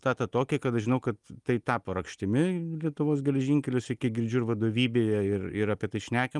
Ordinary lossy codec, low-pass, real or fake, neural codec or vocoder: Opus, 32 kbps; 10.8 kHz; real; none